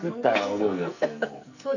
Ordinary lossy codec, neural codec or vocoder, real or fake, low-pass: none; codec, 44.1 kHz, 2.6 kbps, SNAC; fake; 7.2 kHz